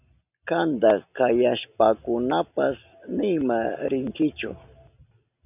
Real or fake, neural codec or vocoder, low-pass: real; none; 3.6 kHz